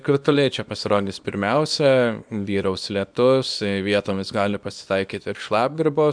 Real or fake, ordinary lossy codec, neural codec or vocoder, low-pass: fake; MP3, 96 kbps; codec, 24 kHz, 0.9 kbps, WavTokenizer, small release; 9.9 kHz